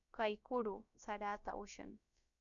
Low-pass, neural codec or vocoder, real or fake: 7.2 kHz; codec, 16 kHz, about 1 kbps, DyCAST, with the encoder's durations; fake